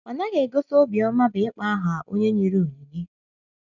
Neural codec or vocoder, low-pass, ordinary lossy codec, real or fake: none; 7.2 kHz; none; real